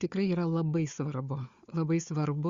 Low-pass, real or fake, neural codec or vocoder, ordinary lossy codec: 7.2 kHz; fake; codec, 16 kHz, 4 kbps, FunCodec, trained on Chinese and English, 50 frames a second; Opus, 64 kbps